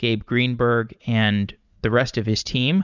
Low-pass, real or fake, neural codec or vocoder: 7.2 kHz; fake; vocoder, 44.1 kHz, 80 mel bands, Vocos